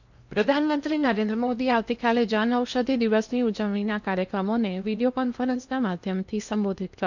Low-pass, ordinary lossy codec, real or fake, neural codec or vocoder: 7.2 kHz; none; fake; codec, 16 kHz in and 24 kHz out, 0.8 kbps, FocalCodec, streaming, 65536 codes